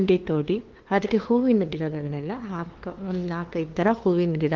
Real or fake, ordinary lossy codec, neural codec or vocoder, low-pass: fake; Opus, 16 kbps; codec, 16 kHz, 2 kbps, FunCodec, trained on LibriTTS, 25 frames a second; 7.2 kHz